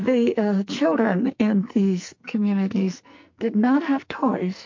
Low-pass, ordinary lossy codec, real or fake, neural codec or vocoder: 7.2 kHz; MP3, 48 kbps; fake; codec, 44.1 kHz, 2.6 kbps, SNAC